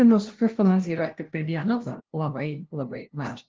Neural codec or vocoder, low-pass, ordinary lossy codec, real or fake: codec, 16 kHz, 0.5 kbps, FunCodec, trained on LibriTTS, 25 frames a second; 7.2 kHz; Opus, 16 kbps; fake